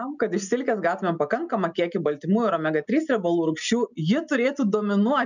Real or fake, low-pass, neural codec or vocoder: real; 7.2 kHz; none